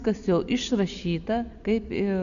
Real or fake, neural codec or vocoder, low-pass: real; none; 7.2 kHz